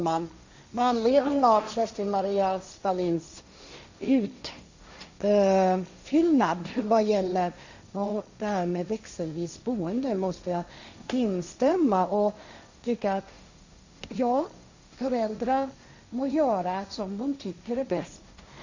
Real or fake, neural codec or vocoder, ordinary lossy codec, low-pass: fake; codec, 16 kHz, 1.1 kbps, Voila-Tokenizer; Opus, 64 kbps; 7.2 kHz